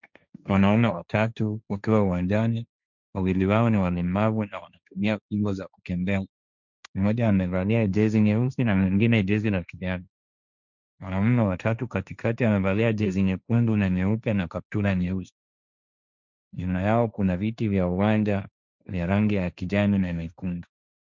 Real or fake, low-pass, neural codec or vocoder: fake; 7.2 kHz; codec, 16 kHz, 1.1 kbps, Voila-Tokenizer